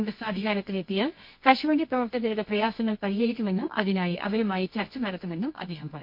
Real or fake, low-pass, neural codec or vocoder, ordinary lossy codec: fake; 5.4 kHz; codec, 24 kHz, 0.9 kbps, WavTokenizer, medium music audio release; MP3, 32 kbps